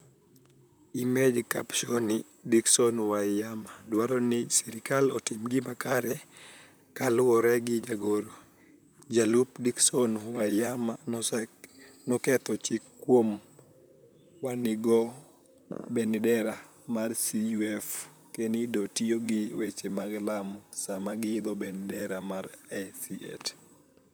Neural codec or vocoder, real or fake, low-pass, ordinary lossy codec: vocoder, 44.1 kHz, 128 mel bands, Pupu-Vocoder; fake; none; none